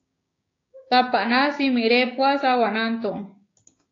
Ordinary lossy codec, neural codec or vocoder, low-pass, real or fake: AAC, 32 kbps; codec, 16 kHz, 6 kbps, DAC; 7.2 kHz; fake